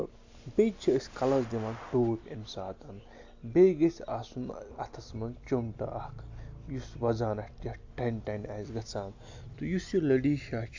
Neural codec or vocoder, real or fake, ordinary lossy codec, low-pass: none; real; AAC, 48 kbps; 7.2 kHz